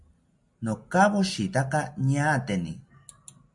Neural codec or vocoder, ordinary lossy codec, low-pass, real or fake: none; MP3, 64 kbps; 10.8 kHz; real